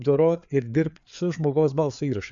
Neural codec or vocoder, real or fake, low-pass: codec, 16 kHz, 4 kbps, FreqCodec, larger model; fake; 7.2 kHz